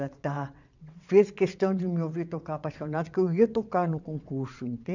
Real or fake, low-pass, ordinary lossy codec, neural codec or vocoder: fake; 7.2 kHz; none; vocoder, 22.05 kHz, 80 mel bands, WaveNeXt